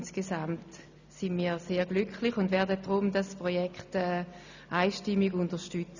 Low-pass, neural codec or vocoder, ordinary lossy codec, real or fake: 7.2 kHz; none; none; real